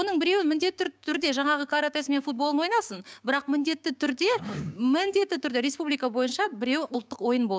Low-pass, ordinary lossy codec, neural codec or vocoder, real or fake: none; none; codec, 16 kHz, 6 kbps, DAC; fake